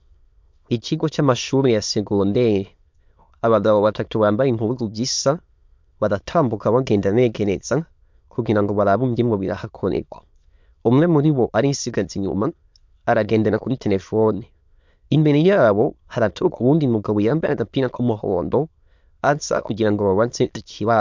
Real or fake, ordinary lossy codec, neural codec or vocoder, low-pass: fake; MP3, 64 kbps; autoencoder, 22.05 kHz, a latent of 192 numbers a frame, VITS, trained on many speakers; 7.2 kHz